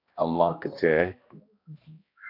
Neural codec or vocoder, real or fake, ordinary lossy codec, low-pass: codec, 16 kHz, 1 kbps, X-Codec, HuBERT features, trained on balanced general audio; fake; AAC, 32 kbps; 5.4 kHz